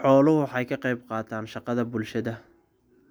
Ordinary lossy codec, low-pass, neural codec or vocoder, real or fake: none; none; none; real